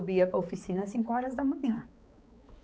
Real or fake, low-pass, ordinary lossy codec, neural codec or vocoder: fake; none; none; codec, 16 kHz, 4 kbps, X-Codec, HuBERT features, trained on balanced general audio